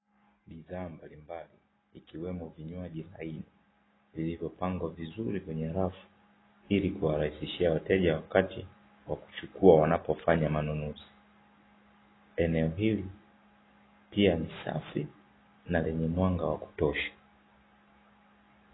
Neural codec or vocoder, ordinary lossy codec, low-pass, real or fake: none; AAC, 16 kbps; 7.2 kHz; real